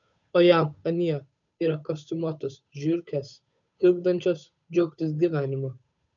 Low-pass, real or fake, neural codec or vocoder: 7.2 kHz; fake; codec, 16 kHz, 8 kbps, FunCodec, trained on Chinese and English, 25 frames a second